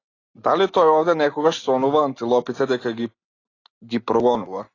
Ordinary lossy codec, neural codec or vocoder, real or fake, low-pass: AAC, 32 kbps; none; real; 7.2 kHz